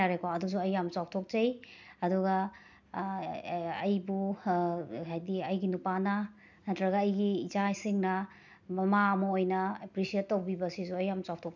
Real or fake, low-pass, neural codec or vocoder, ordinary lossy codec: real; 7.2 kHz; none; none